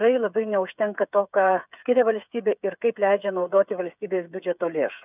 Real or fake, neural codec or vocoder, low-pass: fake; codec, 16 kHz, 8 kbps, FreqCodec, smaller model; 3.6 kHz